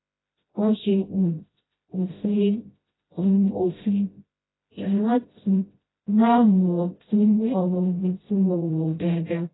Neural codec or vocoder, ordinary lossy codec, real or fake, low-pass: codec, 16 kHz, 0.5 kbps, FreqCodec, smaller model; AAC, 16 kbps; fake; 7.2 kHz